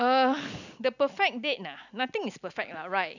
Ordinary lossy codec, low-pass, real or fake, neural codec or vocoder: none; 7.2 kHz; real; none